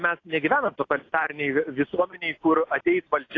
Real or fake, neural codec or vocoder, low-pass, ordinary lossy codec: real; none; 7.2 kHz; AAC, 32 kbps